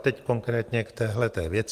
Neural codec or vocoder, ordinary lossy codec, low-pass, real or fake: none; Opus, 24 kbps; 14.4 kHz; real